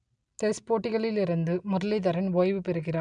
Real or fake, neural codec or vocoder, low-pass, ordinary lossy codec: real; none; 9.9 kHz; none